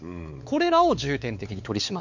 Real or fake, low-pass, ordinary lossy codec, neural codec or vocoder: fake; 7.2 kHz; none; codec, 16 kHz, 4 kbps, X-Codec, WavLM features, trained on Multilingual LibriSpeech